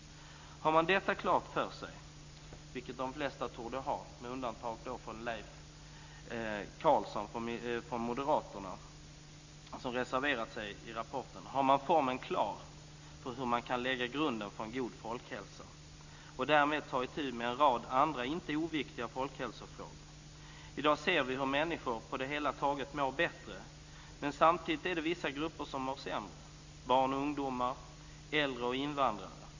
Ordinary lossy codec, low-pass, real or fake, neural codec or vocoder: Opus, 64 kbps; 7.2 kHz; real; none